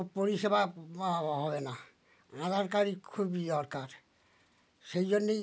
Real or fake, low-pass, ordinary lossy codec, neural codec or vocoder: real; none; none; none